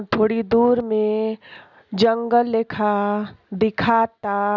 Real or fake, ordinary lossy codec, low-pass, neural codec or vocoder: real; none; 7.2 kHz; none